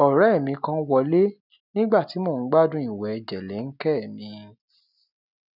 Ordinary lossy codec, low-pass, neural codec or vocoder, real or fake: none; 5.4 kHz; none; real